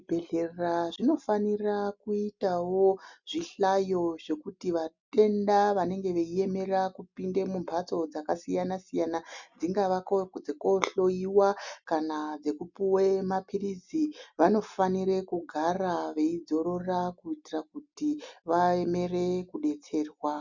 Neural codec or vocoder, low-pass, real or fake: none; 7.2 kHz; real